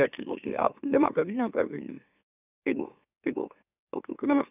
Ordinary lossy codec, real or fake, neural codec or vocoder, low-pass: none; fake; autoencoder, 44.1 kHz, a latent of 192 numbers a frame, MeloTTS; 3.6 kHz